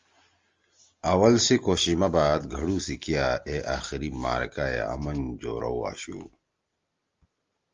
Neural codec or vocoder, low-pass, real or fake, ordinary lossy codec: none; 7.2 kHz; real; Opus, 32 kbps